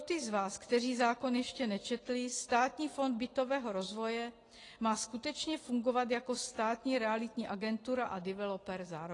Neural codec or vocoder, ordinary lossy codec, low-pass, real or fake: none; AAC, 32 kbps; 10.8 kHz; real